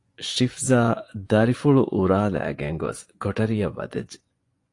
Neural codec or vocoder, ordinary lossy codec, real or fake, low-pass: vocoder, 24 kHz, 100 mel bands, Vocos; AAC, 48 kbps; fake; 10.8 kHz